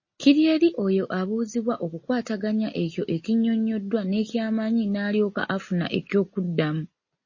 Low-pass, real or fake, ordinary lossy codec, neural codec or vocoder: 7.2 kHz; real; MP3, 32 kbps; none